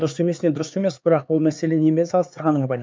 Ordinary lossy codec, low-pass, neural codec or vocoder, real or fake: none; none; codec, 16 kHz, 4 kbps, X-Codec, HuBERT features, trained on LibriSpeech; fake